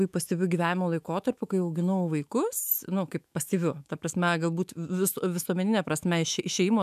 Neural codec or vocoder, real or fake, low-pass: autoencoder, 48 kHz, 128 numbers a frame, DAC-VAE, trained on Japanese speech; fake; 14.4 kHz